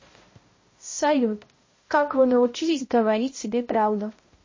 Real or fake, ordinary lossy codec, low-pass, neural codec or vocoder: fake; MP3, 32 kbps; 7.2 kHz; codec, 16 kHz, 0.5 kbps, X-Codec, HuBERT features, trained on balanced general audio